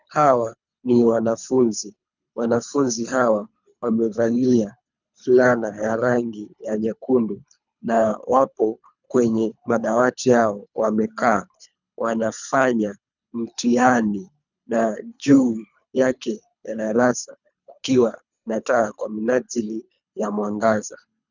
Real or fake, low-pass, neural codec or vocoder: fake; 7.2 kHz; codec, 24 kHz, 3 kbps, HILCodec